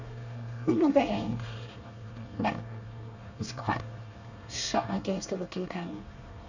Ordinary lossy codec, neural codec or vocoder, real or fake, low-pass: none; codec, 24 kHz, 1 kbps, SNAC; fake; 7.2 kHz